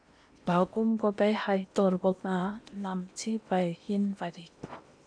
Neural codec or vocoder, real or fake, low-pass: codec, 16 kHz in and 24 kHz out, 0.8 kbps, FocalCodec, streaming, 65536 codes; fake; 9.9 kHz